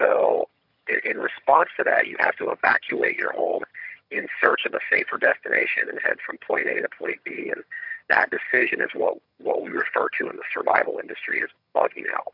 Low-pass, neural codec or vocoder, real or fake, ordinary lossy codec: 5.4 kHz; vocoder, 22.05 kHz, 80 mel bands, HiFi-GAN; fake; MP3, 48 kbps